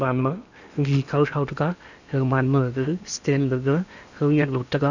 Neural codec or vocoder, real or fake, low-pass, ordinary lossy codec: codec, 16 kHz in and 24 kHz out, 0.8 kbps, FocalCodec, streaming, 65536 codes; fake; 7.2 kHz; none